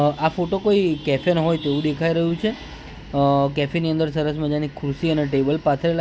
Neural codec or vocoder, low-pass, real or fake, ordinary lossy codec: none; none; real; none